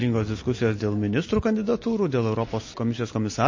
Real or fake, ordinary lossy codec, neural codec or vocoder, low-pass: real; MP3, 32 kbps; none; 7.2 kHz